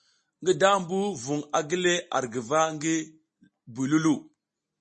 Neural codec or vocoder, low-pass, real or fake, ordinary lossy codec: none; 10.8 kHz; real; MP3, 32 kbps